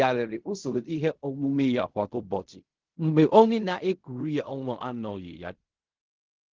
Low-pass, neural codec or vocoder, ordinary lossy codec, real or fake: 7.2 kHz; codec, 16 kHz in and 24 kHz out, 0.4 kbps, LongCat-Audio-Codec, fine tuned four codebook decoder; Opus, 16 kbps; fake